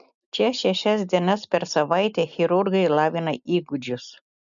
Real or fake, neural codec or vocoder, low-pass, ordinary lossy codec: real; none; 7.2 kHz; MP3, 96 kbps